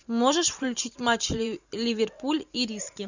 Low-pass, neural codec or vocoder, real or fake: 7.2 kHz; vocoder, 44.1 kHz, 128 mel bands every 512 samples, BigVGAN v2; fake